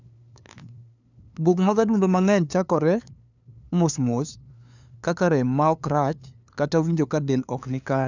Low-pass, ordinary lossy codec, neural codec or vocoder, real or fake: 7.2 kHz; none; codec, 16 kHz, 2 kbps, FunCodec, trained on LibriTTS, 25 frames a second; fake